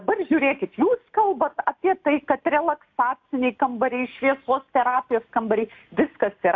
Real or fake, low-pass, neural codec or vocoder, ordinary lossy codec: real; 7.2 kHz; none; AAC, 48 kbps